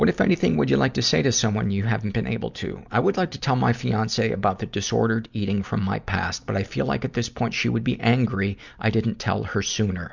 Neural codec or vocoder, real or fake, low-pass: none; real; 7.2 kHz